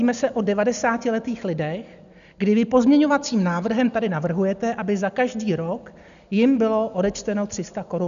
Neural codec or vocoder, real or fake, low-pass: none; real; 7.2 kHz